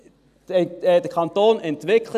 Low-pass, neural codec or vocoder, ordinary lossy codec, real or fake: 14.4 kHz; none; none; real